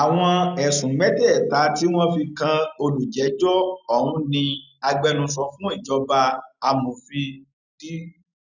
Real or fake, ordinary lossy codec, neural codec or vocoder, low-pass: real; none; none; 7.2 kHz